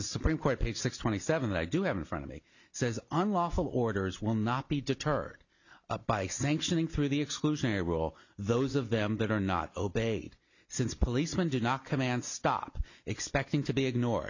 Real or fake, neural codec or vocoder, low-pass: real; none; 7.2 kHz